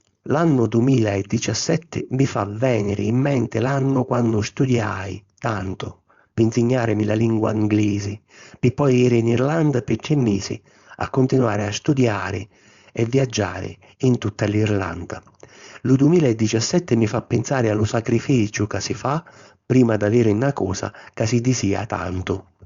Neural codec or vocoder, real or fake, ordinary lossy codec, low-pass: codec, 16 kHz, 4.8 kbps, FACodec; fake; Opus, 64 kbps; 7.2 kHz